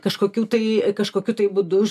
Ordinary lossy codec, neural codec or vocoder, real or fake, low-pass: MP3, 96 kbps; none; real; 14.4 kHz